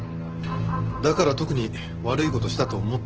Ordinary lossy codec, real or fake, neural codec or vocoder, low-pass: Opus, 16 kbps; real; none; 7.2 kHz